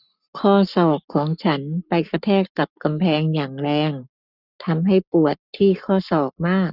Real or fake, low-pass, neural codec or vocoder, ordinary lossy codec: fake; 5.4 kHz; codec, 44.1 kHz, 7.8 kbps, Pupu-Codec; none